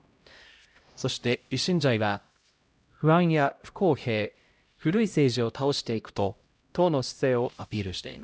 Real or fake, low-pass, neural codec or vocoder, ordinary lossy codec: fake; none; codec, 16 kHz, 0.5 kbps, X-Codec, HuBERT features, trained on LibriSpeech; none